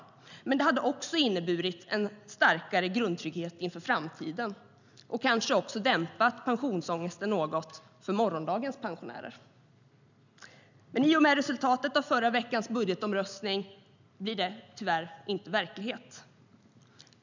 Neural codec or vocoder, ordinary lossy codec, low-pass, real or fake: none; none; 7.2 kHz; real